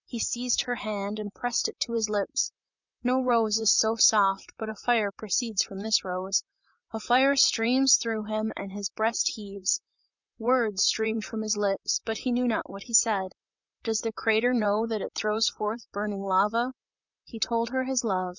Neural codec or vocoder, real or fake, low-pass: vocoder, 44.1 kHz, 128 mel bands, Pupu-Vocoder; fake; 7.2 kHz